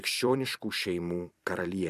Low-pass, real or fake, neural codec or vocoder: 14.4 kHz; fake; vocoder, 44.1 kHz, 128 mel bands every 256 samples, BigVGAN v2